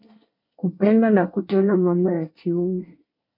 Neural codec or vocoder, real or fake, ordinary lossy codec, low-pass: codec, 24 kHz, 1 kbps, SNAC; fake; MP3, 32 kbps; 5.4 kHz